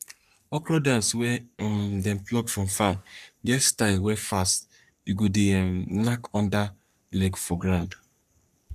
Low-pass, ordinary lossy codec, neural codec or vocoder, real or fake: 14.4 kHz; none; codec, 44.1 kHz, 3.4 kbps, Pupu-Codec; fake